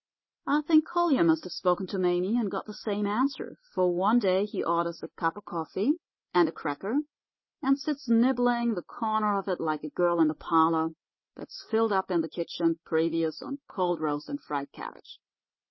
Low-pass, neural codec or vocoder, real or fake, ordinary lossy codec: 7.2 kHz; none; real; MP3, 24 kbps